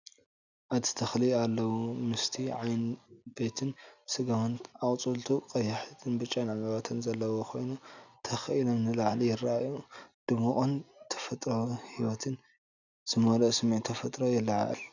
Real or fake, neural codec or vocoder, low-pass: real; none; 7.2 kHz